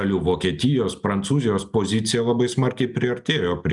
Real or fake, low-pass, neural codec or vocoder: real; 10.8 kHz; none